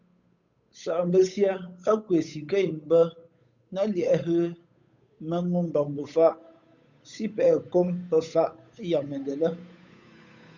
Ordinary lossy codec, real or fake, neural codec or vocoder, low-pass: MP3, 64 kbps; fake; codec, 16 kHz, 8 kbps, FunCodec, trained on Chinese and English, 25 frames a second; 7.2 kHz